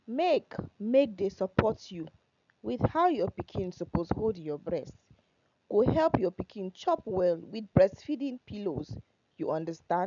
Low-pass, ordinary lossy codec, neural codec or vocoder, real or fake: 7.2 kHz; none; none; real